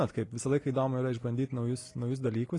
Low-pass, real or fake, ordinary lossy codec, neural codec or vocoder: 10.8 kHz; real; AAC, 32 kbps; none